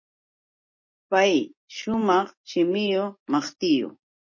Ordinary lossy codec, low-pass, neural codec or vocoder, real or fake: MP3, 32 kbps; 7.2 kHz; none; real